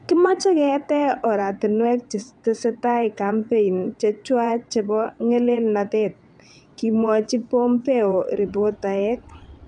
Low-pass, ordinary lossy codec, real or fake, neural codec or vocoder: 9.9 kHz; none; fake; vocoder, 22.05 kHz, 80 mel bands, WaveNeXt